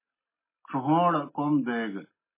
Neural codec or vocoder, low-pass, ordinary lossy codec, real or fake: none; 3.6 kHz; MP3, 16 kbps; real